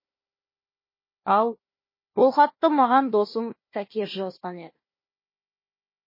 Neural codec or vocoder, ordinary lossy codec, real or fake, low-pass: codec, 16 kHz, 1 kbps, FunCodec, trained on Chinese and English, 50 frames a second; MP3, 24 kbps; fake; 5.4 kHz